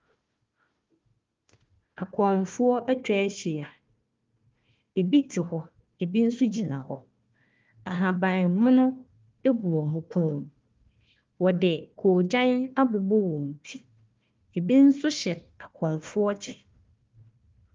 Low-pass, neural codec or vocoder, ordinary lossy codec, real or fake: 7.2 kHz; codec, 16 kHz, 1 kbps, FunCodec, trained on Chinese and English, 50 frames a second; Opus, 32 kbps; fake